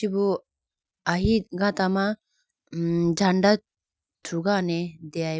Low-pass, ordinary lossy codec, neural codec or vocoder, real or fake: none; none; none; real